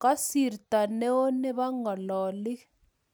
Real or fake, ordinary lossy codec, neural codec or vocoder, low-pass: real; none; none; none